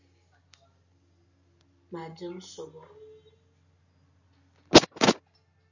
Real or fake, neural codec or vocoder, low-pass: real; none; 7.2 kHz